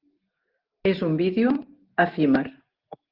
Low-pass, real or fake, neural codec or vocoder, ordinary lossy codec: 5.4 kHz; real; none; Opus, 16 kbps